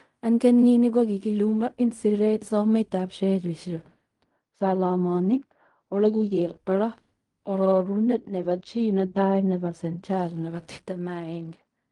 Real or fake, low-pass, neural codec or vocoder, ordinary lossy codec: fake; 10.8 kHz; codec, 16 kHz in and 24 kHz out, 0.4 kbps, LongCat-Audio-Codec, fine tuned four codebook decoder; Opus, 32 kbps